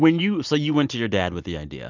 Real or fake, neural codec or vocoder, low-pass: real; none; 7.2 kHz